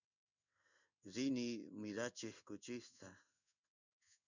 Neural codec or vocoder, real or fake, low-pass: codec, 16 kHz in and 24 kHz out, 1 kbps, XY-Tokenizer; fake; 7.2 kHz